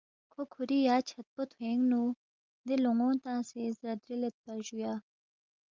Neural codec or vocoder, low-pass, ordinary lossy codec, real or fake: none; 7.2 kHz; Opus, 24 kbps; real